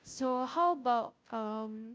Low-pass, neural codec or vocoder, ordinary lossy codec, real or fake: none; codec, 16 kHz, 0.5 kbps, FunCodec, trained on Chinese and English, 25 frames a second; none; fake